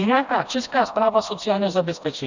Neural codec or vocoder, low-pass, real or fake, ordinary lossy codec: codec, 16 kHz, 1 kbps, FreqCodec, smaller model; 7.2 kHz; fake; Opus, 64 kbps